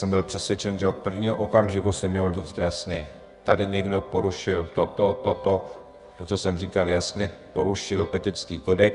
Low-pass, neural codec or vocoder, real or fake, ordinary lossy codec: 10.8 kHz; codec, 24 kHz, 0.9 kbps, WavTokenizer, medium music audio release; fake; Opus, 64 kbps